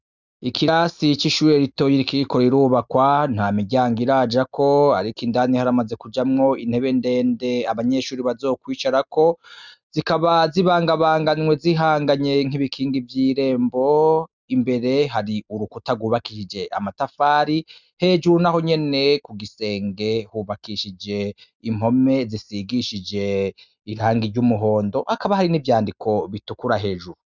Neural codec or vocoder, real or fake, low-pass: none; real; 7.2 kHz